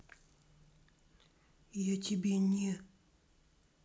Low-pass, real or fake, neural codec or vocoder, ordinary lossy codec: none; real; none; none